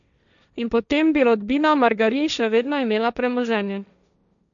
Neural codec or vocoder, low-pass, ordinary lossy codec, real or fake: codec, 16 kHz, 1.1 kbps, Voila-Tokenizer; 7.2 kHz; none; fake